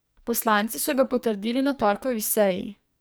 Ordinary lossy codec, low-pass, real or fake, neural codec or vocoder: none; none; fake; codec, 44.1 kHz, 2.6 kbps, SNAC